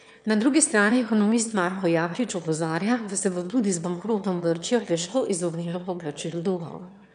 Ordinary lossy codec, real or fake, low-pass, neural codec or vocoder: none; fake; 9.9 kHz; autoencoder, 22.05 kHz, a latent of 192 numbers a frame, VITS, trained on one speaker